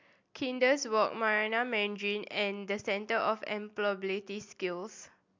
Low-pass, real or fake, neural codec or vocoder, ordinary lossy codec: 7.2 kHz; real; none; MP3, 64 kbps